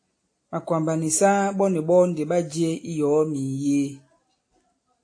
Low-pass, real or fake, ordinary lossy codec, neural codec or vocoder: 9.9 kHz; real; AAC, 48 kbps; none